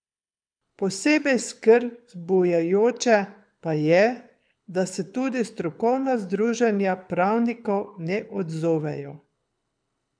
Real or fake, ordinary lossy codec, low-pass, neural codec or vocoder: fake; none; 9.9 kHz; codec, 24 kHz, 6 kbps, HILCodec